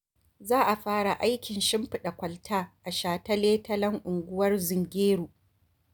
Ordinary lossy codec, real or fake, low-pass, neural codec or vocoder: none; real; none; none